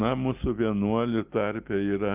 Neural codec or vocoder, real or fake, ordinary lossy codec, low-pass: none; real; Opus, 32 kbps; 3.6 kHz